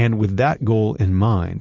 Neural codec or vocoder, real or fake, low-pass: none; real; 7.2 kHz